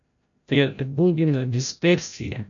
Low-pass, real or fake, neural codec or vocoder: 7.2 kHz; fake; codec, 16 kHz, 0.5 kbps, FreqCodec, larger model